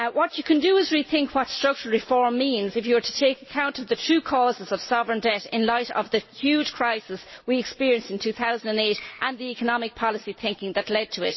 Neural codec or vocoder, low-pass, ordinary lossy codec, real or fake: none; 7.2 kHz; MP3, 24 kbps; real